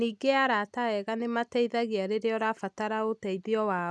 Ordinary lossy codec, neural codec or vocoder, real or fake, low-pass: none; none; real; none